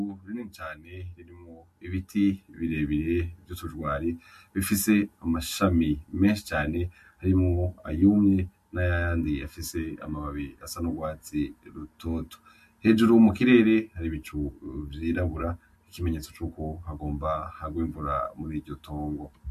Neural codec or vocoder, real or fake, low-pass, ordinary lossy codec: none; real; 14.4 kHz; MP3, 64 kbps